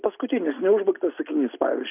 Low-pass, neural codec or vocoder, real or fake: 3.6 kHz; none; real